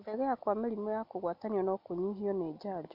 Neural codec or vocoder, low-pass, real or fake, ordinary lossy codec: none; 5.4 kHz; real; none